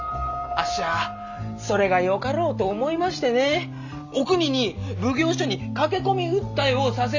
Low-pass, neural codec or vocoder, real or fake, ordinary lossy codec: 7.2 kHz; none; real; none